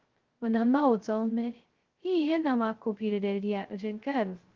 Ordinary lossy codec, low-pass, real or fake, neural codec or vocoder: Opus, 16 kbps; 7.2 kHz; fake; codec, 16 kHz, 0.2 kbps, FocalCodec